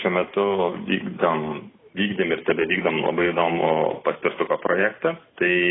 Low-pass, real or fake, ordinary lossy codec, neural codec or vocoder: 7.2 kHz; real; AAC, 16 kbps; none